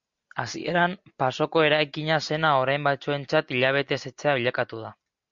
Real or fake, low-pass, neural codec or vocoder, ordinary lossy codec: real; 7.2 kHz; none; MP3, 48 kbps